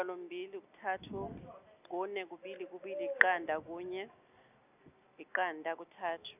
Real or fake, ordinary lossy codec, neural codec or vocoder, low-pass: real; none; none; 3.6 kHz